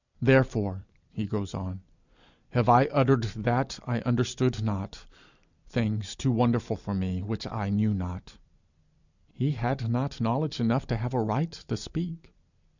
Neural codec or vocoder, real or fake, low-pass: vocoder, 44.1 kHz, 128 mel bands every 256 samples, BigVGAN v2; fake; 7.2 kHz